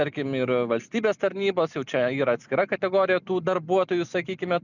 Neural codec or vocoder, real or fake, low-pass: none; real; 7.2 kHz